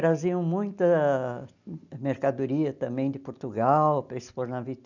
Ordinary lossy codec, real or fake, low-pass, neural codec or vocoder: none; real; 7.2 kHz; none